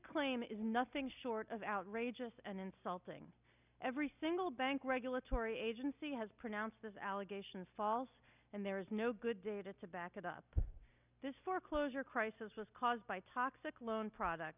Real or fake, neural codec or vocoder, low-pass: real; none; 3.6 kHz